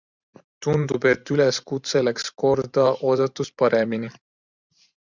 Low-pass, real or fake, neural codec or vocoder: 7.2 kHz; fake; vocoder, 44.1 kHz, 128 mel bands every 512 samples, BigVGAN v2